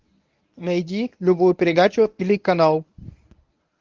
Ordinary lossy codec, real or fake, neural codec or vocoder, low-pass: Opus, 24 kbps; fake; codec, 24 kHz, 0.9 kbps, WavTokenizer, medium speech release version 1; 7.2 kHz